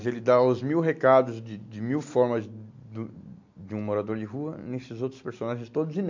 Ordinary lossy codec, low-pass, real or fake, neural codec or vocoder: MP3, 48 kbps; 7.2 kHz; real; none